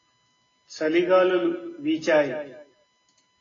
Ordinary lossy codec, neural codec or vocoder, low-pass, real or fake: AAC, 32 kbps; none; 7.2 kHz; real